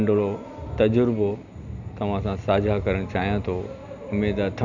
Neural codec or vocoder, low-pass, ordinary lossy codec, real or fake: none; 7.2 kHz; none; real